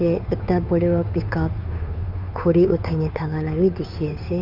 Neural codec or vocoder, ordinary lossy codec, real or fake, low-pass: codec, 16 kHz, 2 kbps, FunCodec, trained on Chinese and English, 25 frames a second; none; fake; 5.4 kHz